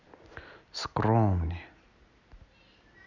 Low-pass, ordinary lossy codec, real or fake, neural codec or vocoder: 7.2 kHz; none; real; none